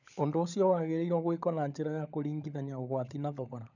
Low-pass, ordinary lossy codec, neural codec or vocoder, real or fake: 7.2 kHz; none; codec, 16 kHz, 16 kbps, FunCodec, trained on LibriTTS, 50 frames a second; fake